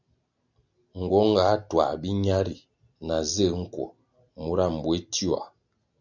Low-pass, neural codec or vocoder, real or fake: 7.2 kHz; none; real